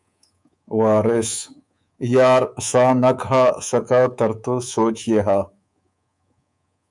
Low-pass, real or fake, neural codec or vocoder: 10.8 kHz; fake; codec, 24 kHz, 3.1 kbps, DualCodec